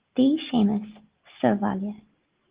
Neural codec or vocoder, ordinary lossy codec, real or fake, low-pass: none; Opus, 16 kbps; real; 3.6 kHz